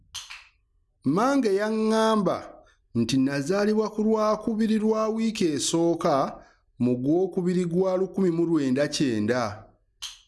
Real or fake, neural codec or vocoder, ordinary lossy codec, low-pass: real; none; none; none